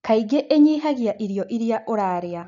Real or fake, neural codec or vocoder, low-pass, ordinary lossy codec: real; none; 7.2 kHz; none